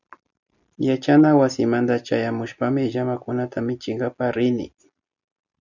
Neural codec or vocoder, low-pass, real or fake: none; 7.2 kHz; real